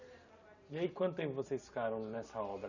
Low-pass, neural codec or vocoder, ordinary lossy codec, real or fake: 7.2 kHz; none; none; real